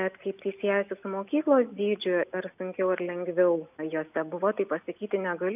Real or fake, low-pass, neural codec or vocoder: real; 3.6 kHz; none